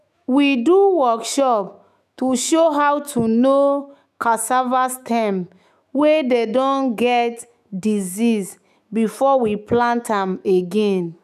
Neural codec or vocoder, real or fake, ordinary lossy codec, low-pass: autoencoder, 48 kHz, 128 numbers a frame, DAC-VAE, trained on Japanese speech; fake; none; 14.4 kHz